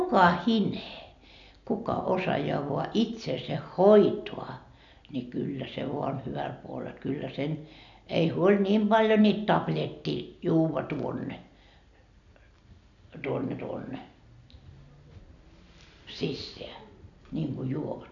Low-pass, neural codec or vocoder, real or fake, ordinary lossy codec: 7.2 kHz; none; real; none